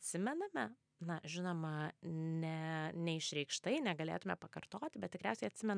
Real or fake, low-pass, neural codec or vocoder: real; 9.9 kHz; none